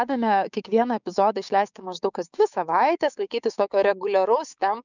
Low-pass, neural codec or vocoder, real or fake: 7.2 kHz; codec, 16 kHz, 6 kbps, DAC; fake